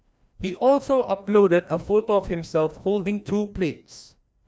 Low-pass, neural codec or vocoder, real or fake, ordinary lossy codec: none; codec, 16 kHz, 1 kbps, FreqCodec, larger model; fake; none